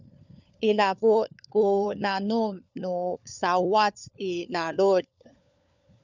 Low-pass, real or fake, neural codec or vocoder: 7.2 kHz; fake; codec, 16 kHz, 4 kbps, FunCodec, trained on LibriTTS, 50 frames a second